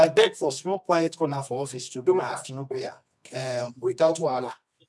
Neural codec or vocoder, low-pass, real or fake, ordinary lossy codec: codec, 24 kHz, 0.9 kbps, WavTokenizer, medium music audio release; none; fake; none